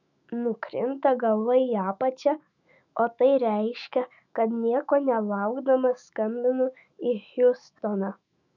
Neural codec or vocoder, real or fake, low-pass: autoencoder, 48 kHz, 128 numbers a frame, DAC-VAE, trained on Japanese speech; fake; 7.2 kHz